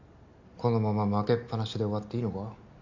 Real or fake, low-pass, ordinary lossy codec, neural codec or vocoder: real; 7.2 kHz; MP3, 48 kbps; none